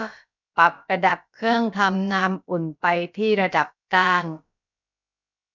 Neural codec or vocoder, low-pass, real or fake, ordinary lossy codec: codec, 16 kHz, about 1 kbps, DyCAST, with the encoder's durations; 7.2 kHz; fake; none